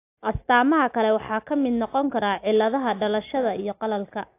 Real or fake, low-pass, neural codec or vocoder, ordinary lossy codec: real; 3.6 kHz; none; AAC, 24 kbps